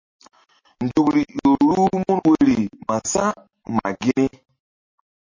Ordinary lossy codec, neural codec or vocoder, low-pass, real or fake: MP3, 32 kbps; none; 7.2 kHz; real